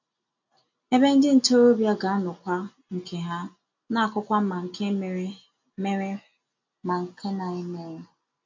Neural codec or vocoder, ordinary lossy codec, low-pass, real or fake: none; MP3, 48 kbps; 7.2 kHz; real